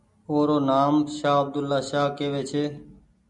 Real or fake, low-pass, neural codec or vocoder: real; 10.8 kHz; none